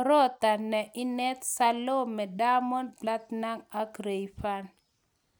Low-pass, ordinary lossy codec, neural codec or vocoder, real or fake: none; none; none; real